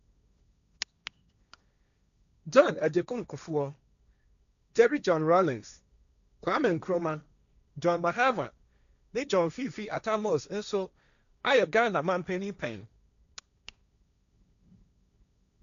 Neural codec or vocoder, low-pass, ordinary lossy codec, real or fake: codec, 16 kHz, 1.1 kbps, Voila-Tokenizer; 7.2 kHz; none; fake